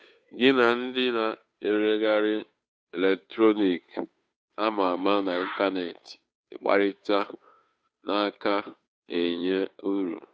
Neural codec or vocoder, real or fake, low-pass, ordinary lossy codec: codec, 16 kHz, 2 kbps, FunCodec, trained on Chinese and English, 25 frames a second; fake; none; none